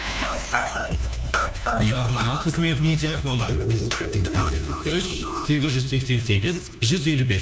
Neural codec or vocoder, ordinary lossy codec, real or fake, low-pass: codec, 16 kHz, 1 kbps, FunCodec, trained on LibriTTS, 50 frames a second; none; fake; none